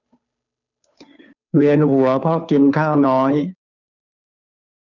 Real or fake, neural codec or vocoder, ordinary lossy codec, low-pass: fake; codec, 16 kHz, 2 kbps, FunCodec, trained on Chinese and English, 25 frames a second; none; 7.2 kHz